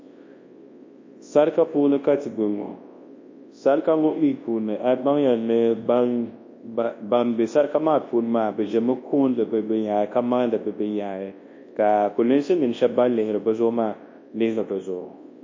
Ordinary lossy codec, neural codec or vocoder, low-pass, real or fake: MP3, 32 kbps; codec, 24 kHz, 0.9 kbps, WavTokenizer, large speech release; 7.2 kHz; fake